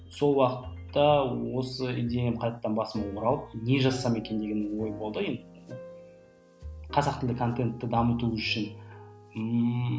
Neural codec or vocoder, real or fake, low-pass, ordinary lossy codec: none; real; none; none